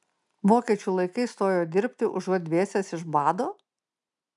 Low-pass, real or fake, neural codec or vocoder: 10.8 kHz; real; none